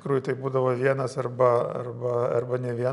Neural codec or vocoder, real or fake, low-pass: none; real; 10.8 kHz